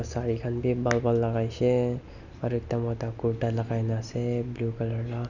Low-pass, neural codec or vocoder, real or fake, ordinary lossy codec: 7.2 kHz; none; real; none